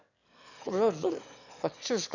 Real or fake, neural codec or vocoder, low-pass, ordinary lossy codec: fake; autoencoder, 22.05 kHz, a latent of 192 numbers a frame, VITS, trained on one speaker; 7.2 kHz; none